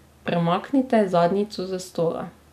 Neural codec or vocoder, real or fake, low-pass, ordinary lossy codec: none; real; 14.4 kHz; none